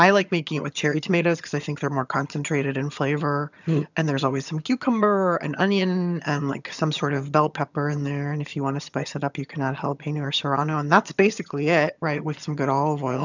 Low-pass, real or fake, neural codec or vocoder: 7.2 kHz; fake; vocoder, 22.05 kHz, 80 mel bands, HiFi-GAN